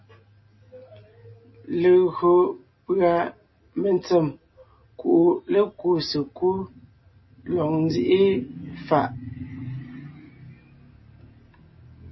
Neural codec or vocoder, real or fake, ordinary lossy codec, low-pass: none; real; MP3, 24 kbps; 7.2 kHz